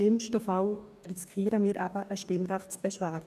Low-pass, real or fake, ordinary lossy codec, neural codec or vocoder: 14.4 kHz; fake; none; codec, 44.1 kHz, 2.6 kbps, DAC